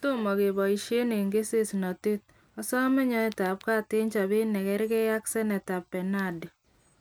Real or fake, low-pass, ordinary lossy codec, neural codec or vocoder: real; none; none; none